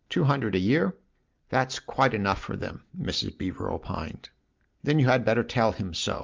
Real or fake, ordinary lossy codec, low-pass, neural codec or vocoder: real; Opus, 32 kbps; 7.2 kHz; none